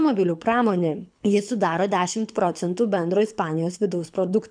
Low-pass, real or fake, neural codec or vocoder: 9.9 kHz; fake; codec, 24 kHz, 6 kbps, HILCodec